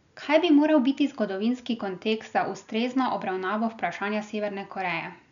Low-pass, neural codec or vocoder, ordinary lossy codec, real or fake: 7.2 kHz; none; none; real